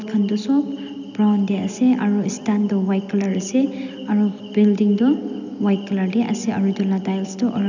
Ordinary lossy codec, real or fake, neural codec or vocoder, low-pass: none; real; none; 7.2 kHz